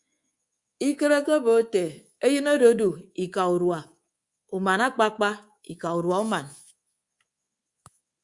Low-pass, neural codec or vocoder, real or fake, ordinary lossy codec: 10.8 kHz; codec, 24 kHz, 3.1 kbps, DualCodec; fake; Opus, 64 kbps